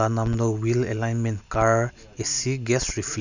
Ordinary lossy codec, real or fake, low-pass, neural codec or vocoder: none; real; 7.2 kHz; none